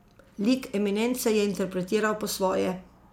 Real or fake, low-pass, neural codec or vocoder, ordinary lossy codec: real; 19.8 kHz; none; MP3, 96 kbps